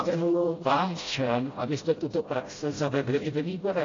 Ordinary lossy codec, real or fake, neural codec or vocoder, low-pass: AAC, 32 kbps; fake; codec, 16 kHz, 0.5 kbps, FreqCodec, smaller model; 7.2 kHz